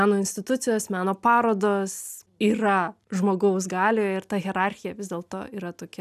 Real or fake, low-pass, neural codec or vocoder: real; 14.4 kHz; none